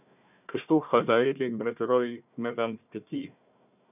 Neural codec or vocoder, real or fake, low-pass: codec, 16 kHz, 1 kbps, FunCodec, trained on Chinese and English, 50 frames a second; fake; 3.6 kHz